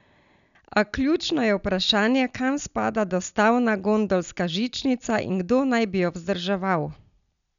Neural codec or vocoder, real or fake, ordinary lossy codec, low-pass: none; real; none; 7.2 kHz